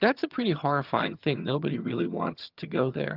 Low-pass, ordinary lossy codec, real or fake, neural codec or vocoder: 5.4 kHz; Opus, 24 kbps; fake; vocoder, 22.05 kHz, 80 mel bands, HiFi-GAN